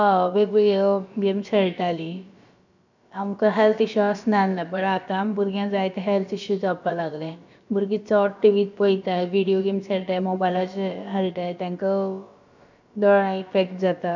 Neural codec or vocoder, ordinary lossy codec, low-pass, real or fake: codec, 16 kHz, about 1 kbps, DyCAST, with the encoder's durations; none; 7.2 kHz; fake